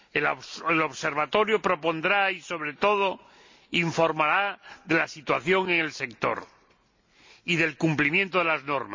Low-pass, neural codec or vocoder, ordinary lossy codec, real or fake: 7.2 kHz; none; MP3, 48 kbps; real